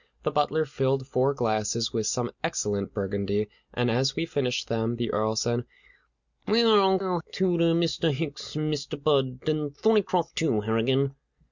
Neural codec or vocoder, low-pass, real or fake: none; 7.2 kHz; real